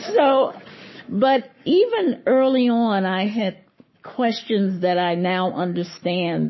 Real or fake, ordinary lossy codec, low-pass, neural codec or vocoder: fake; MP3, 24 kbps; 7.2 kHz; codec, 44.1 kHz, 7.8 kbps, Pupu-Codec